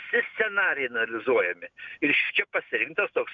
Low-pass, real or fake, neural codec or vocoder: 7.2 kHz; real; none